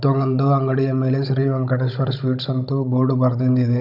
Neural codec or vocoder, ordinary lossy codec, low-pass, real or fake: codec, 16 kHz, 16 kbps, FunCodec, trained on Chinese and English, 50 frames a second; none; 5.4 kHz; fake